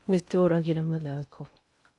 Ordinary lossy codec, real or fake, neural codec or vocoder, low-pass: AAC, 64 kbps; fake; codec, 16 kHz in and 24 kHz out, 0.6 kbps, FocalCodec, streaming, 2048 codes; 10.8 kHz